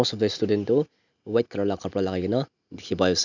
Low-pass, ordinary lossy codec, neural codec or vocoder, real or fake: 7.2 kHz; none; none; real